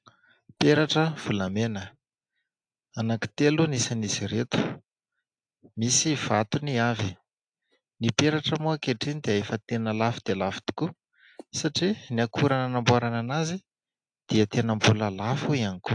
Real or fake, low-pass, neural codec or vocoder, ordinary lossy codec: real; 9.9 kHz; none; AAC, 64 kbps